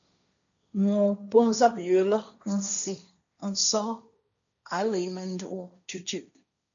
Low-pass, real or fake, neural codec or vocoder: 7.2 kHz; fake; codec, 16 kHz, 1.1 kbps, Voila-Tokenizer